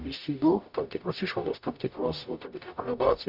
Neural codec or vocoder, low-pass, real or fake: codec, 44.1 kHz, 0.9 kbps, DAC; 5.4 kHz; fake